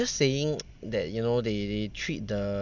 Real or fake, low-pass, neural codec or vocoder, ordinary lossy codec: real; 7.2 kHz; none; none